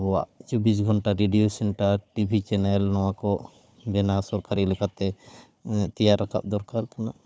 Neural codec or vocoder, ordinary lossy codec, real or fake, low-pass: codec, 16 kHz, 4 kbps, FunCodec, trained on Chinese and English, 50 frames a second; none; fake; none